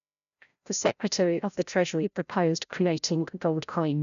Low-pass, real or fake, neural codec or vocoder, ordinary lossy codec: 7.2 kHz; fake; codec, 16 kHz, 0.5 kbps, FreqCodec, larger model; none